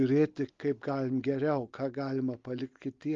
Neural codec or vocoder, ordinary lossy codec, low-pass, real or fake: none; Opus, 32 kbps; 7.2 kHz; real